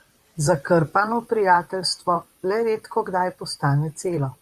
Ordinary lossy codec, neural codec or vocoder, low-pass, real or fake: Opus, 64 kbps; vocoder, 44.1 kHz, 128 mel bands, Pupu-Vocoder; 14.4 kHz; fake